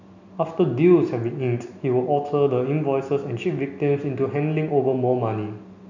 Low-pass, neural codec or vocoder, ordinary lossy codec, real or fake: 7.2 kHz; none; none; real